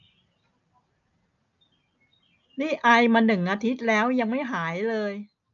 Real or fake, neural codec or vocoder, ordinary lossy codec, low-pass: real; none; none; 7.2 kHz